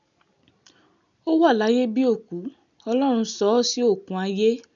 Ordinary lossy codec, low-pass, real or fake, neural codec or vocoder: none; 7.2 kHz; real; none